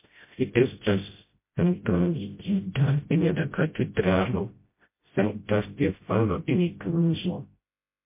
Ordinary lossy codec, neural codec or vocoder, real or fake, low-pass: MP3, 24 kbps; codec, 16 kHz, 0.5 kbps, FreqCodec, smaller model; fake; 3.6 kHz